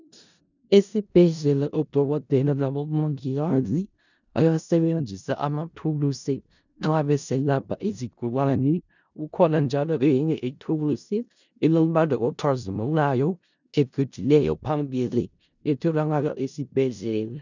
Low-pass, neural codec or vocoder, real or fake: 7.2 kHz; codec, 16 kHz in and 24 kHz out, 0.4 kbps, LongCat-Audio-Codec, four codebook decoder; fake